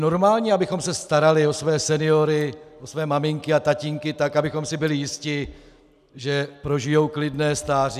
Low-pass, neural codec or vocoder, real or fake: 14.4 kHz; none; real